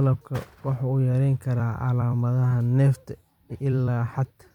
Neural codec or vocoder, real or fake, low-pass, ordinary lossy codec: vocoder, 44.1 kHz, 128 mel bands every 256 samples, BigVGAN v2; fake; 19.8 kHz; MP3, 96 kbps